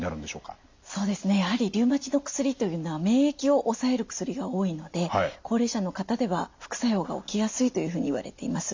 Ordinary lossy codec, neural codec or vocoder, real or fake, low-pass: MP3, 64 kbps; none; real; 7.2 kHz